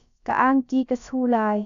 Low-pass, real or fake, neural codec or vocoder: 7.2 kHz; fake; codec, 16 kHz, about 1 kbps, DyCAST, with the encoder's durations